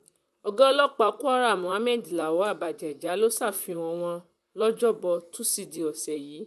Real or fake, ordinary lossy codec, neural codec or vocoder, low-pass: fake; none; vocoder, 44.1 kHz, 128 mel bands, Pupu-Vocoder; 14.4 kHz